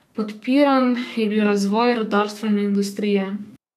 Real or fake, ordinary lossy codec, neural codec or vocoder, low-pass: fake; none; codec, 32 kHz, 1.9 kbps, SNAC; 14.4 kHz